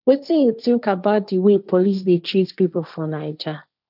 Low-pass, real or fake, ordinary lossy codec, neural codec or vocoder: 5.4 kHz; fake; none; codec, 16 kHz, 1.1 kbps, Voila-Tokenizer